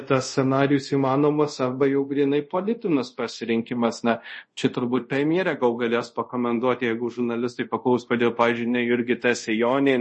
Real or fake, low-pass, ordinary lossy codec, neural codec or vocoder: fake; 10.8 kHz; MP3, 32 kbps; codec, 24 kHz, 0.5 kbps, DualCodec